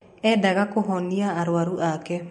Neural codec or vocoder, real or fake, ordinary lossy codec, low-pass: vocoder, 44.1 kHz, 128 mel bands every 256 samples, BigVGAN v2; fake; MP3, 48 kbps; 19.8 kHz